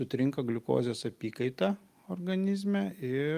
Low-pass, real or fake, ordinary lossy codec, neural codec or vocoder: 14.4 kHz; real; Opus, 32 kbps; none